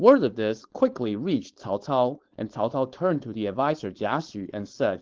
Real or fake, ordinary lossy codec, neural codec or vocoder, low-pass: fake; Opus, 16 kbps; codec, 16 kHz, 4.8 kbps, FACodec; 7.2 kHz